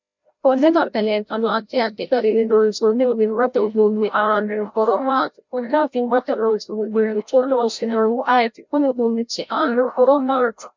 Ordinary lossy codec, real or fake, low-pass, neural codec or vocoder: MP3, 64 kbps; fake; 7.2 kHz; codec, 16 kHz, 0.5 kbps, FreqCodec, larger model